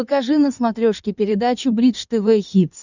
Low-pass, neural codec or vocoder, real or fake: 7.2 kHz; codec, 16 kHz in and 24 kHz out, 2.2 kbps, FireRedTTS-2 codec; fake